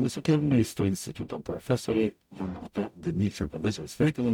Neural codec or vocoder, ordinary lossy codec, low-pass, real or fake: codec, 44.1 kHz, 0.9 kbps, DAC; MP3, 96 kbps; 19.8 kHz; fake